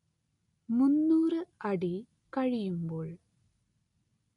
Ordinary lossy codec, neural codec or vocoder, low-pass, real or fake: none; none; 10.8 kHz; real